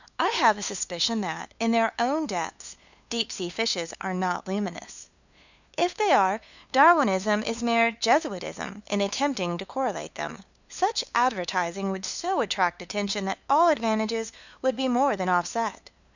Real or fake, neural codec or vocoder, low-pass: fake; codec, 16 kHz, 2 kbps, FunCodec, trained on LibriTTS, 25 frames a second; 7.2 kHz